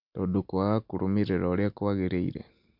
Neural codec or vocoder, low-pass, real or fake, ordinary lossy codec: none; 5.4 kHz; real; MP3, 48 kbps